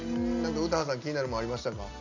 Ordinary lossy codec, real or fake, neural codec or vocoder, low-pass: none; real; none; 7.2 kHz